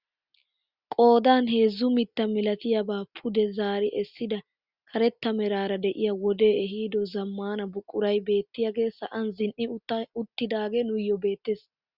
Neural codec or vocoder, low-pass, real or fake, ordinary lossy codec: none; 5.4 kHz; real; Opus, 64 kbps